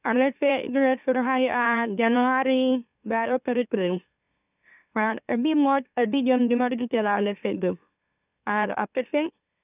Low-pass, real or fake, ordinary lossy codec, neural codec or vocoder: 3.6 kHz; fake; none; autoencoder, 44.1 kHz, a latent of 192 numbers a frame, MeloTTS